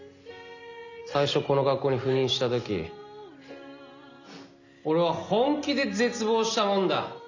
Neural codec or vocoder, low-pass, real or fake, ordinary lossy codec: none; 7.2 kHz; real; none